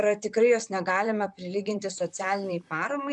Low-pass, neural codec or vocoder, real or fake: 10.8 kHz; vocoder, 44.1 kHz, 128 mel bands, Pupu-Vocoder; fake